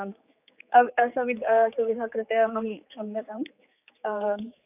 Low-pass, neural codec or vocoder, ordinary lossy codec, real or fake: 3.6 kHz; codec, 16 kHz, 4 kbps, X-Codec, HuBERT features, trained on general audio; AAC, 32 kbps; fake